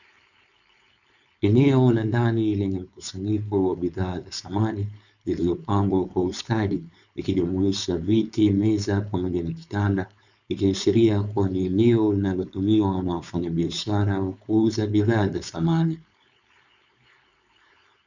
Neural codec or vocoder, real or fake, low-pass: codec, 16 kHz, 4.8 kbps, FACodec; fake; 7.2 kHz